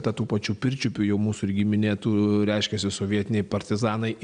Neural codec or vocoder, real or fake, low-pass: none; real; 9.9 kHz